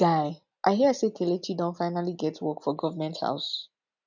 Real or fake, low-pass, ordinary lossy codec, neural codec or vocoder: real; 7.2 kHz; none; none